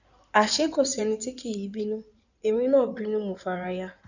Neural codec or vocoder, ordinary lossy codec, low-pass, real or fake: codec, 16 kHz in and 24 kHz out, 2.2 kbps, FireRedTTS-2 codec; none; 7.2 kHz; fake